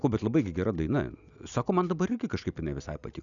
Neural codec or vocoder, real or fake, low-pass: none; real; 7.2 kHz